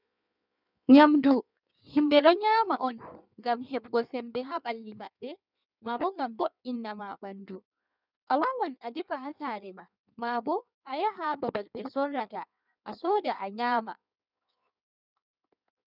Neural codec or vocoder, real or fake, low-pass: codec, 16 kHz in and 24 kHz out, 1.1 kbps, FireRedTTS-2 codec; fake; 5.4 kHz